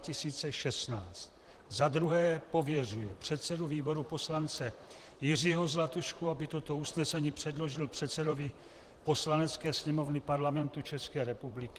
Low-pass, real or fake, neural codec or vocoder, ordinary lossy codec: 14.4 kHz; fake; vocoder, 44.1 kHz, 128 mel bands, Pupu-Vocoder; Opus, 24 kbps